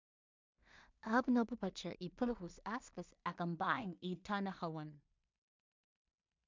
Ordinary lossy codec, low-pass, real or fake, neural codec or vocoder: MP3, 64 kbps; 7.2 kHz; fake; codec, 16 kHz in and 24 kHz out, 0.4 kbps, LongCat-Audio-Codec, two codebook decoder